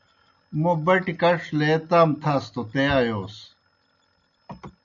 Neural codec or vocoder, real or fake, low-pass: none; real; 7.2 kHz